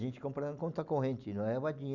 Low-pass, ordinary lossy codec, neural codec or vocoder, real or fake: 7.2 kHz; none; none; real